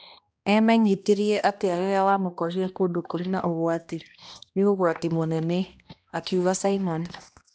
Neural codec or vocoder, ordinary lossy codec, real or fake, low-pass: codec, 16 kHz, 1 kbps, X-Codec, HuBERT features, trained on balanced general audio; none; fake; none